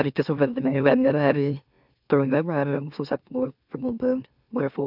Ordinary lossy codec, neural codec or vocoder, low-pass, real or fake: none; autoencoder, 44.1 kHz, a latent of 192 numbers a frame, MeloTTS; 5.4 kHz; fake